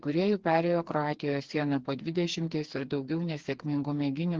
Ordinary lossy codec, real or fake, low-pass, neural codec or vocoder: Opus, 16 kbps; fake; 7.2 kHz; codec, 16 kHz, 4 kbps, FreqCodec, smaller model